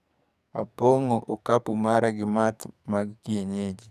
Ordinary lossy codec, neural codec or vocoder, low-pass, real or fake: none; codec, 44.1 kHz, 2.6 kbps, SNAC; 14.4 kHz; fake